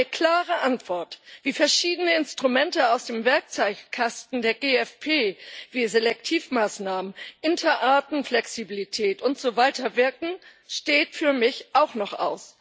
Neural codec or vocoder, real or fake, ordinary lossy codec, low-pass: none; real; none; none